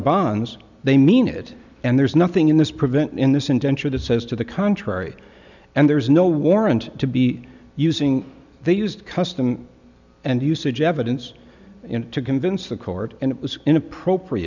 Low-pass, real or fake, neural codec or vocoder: 7.2 kHz; real; none